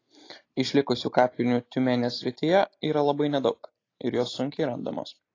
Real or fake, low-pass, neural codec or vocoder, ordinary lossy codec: real; 7.2 kHz; none; AAC, 32 kbps